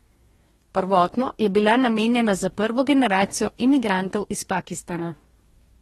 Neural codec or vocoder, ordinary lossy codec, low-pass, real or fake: codec, 44.1 kHz, 2.6 kbps, DAC; AAC, 32 kbps; 19.8 kHz; fake